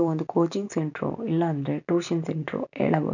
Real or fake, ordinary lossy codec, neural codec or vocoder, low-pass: real; none; none; 7.2 kHz